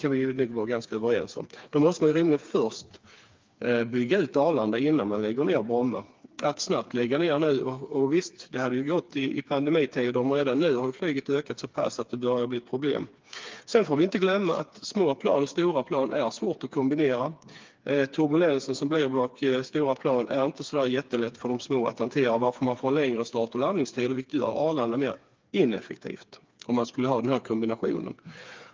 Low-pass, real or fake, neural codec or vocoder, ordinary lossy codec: 7.2 kHz; fake; codec, 16 kHz, 4 kbps, FreqCodec, smaller model; Opus, 24 kbps